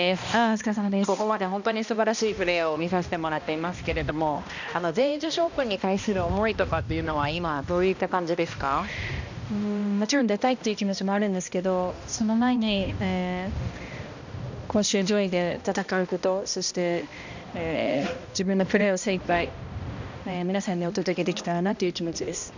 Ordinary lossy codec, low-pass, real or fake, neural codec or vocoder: none; 7.2 kHz; fake; codec, 16 kHz, 1 kbps, X-Codec, HuBERT features, trained on balanced general audio